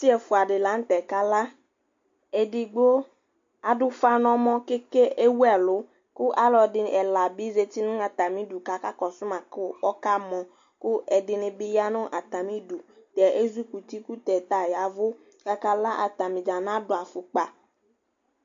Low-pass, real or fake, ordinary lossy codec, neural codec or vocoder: 7.2 kHz; real; MP3, 48 kbps; none